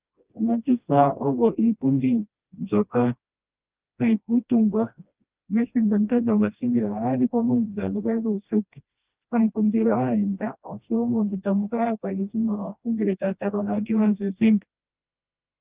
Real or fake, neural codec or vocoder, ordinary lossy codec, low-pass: fake; codec, 16 kHz, 1 kbps, FreqCodec, smaller model; Opus, 24 kbps; 3.6 kHz